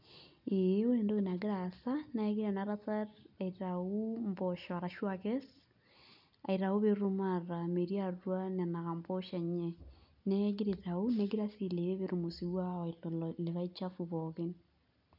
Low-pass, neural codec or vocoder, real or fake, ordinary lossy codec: 5.4 kHz; none; real; none